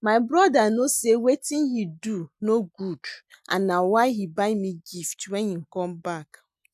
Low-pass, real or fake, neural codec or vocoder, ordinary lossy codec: 14.4 kHz; real; none; none